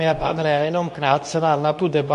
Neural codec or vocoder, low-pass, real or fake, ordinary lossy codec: codec, 24 kHz, 0.9 kbps, WavTokenizer, medium speech release version 2; 10.8 kHz; fake; MP3, 64 kbps